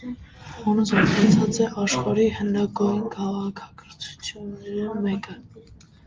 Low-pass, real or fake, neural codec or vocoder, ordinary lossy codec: 7.2 kHz; real; none; Opus, 24 kbps